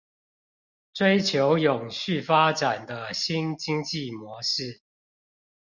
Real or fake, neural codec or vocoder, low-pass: real; none; 7.2 kHz